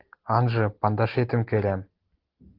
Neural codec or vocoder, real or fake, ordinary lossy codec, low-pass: none; real; Opus, 16 kbps; 5.4 kHz